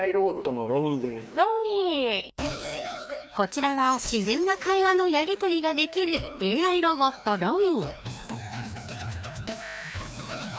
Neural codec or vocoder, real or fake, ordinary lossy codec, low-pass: codec, 16 kHz, 1 kbps, FreqCodec, larger model; fake; none; none